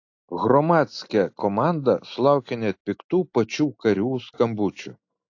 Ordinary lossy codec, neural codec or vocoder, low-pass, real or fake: AAC, 48 kbps; none; 7.2 kHz; real